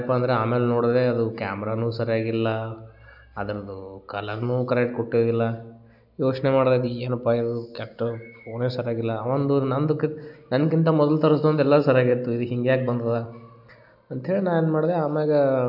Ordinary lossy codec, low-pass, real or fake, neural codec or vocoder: none; 5.4 kHz; real; none